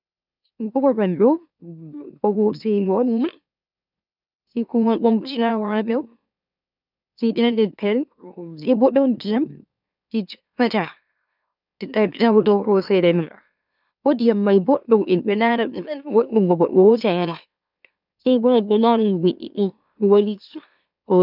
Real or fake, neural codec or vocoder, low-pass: fake; autoencoder, 44.1 kHz, a latent of 192 numbers a frame, MeloTTS; 5.4 kHz